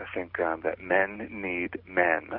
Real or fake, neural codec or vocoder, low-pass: real; none; 5.4 kHz